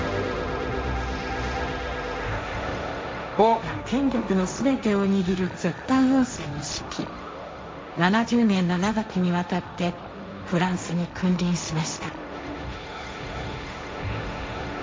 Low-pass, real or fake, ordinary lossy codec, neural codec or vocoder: none; fake; none; codec, 16 kHz, 1.1 kbps, Voila-Tokenizer